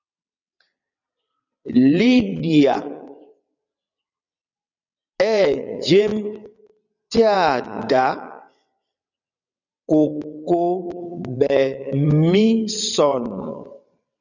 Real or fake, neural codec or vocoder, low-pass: fake; vocoder, 22.05 kHz, 80 mel bands, WaveNeXt; 7.2 kHz